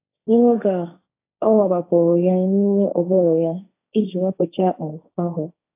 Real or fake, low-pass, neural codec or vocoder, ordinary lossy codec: fake; 3.6 kHz; codec, 16 kHz, 1.1 kbps, Voila-Tokenizer; AAC, 24 kbps